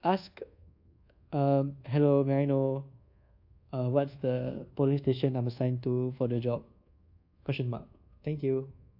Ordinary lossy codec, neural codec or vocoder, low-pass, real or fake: AAC, 48 kbps; codec, 24 kHz, 1.2 kbps, DualCodec; 5.4 kHz; fake